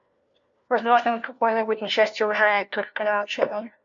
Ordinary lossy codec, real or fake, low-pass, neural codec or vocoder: MP3, 48 kbps; fake; 7.2 kHz; codec, 16 kHz, 1 kbps, FunCodec, trained on LibriTTS, 50 frames a second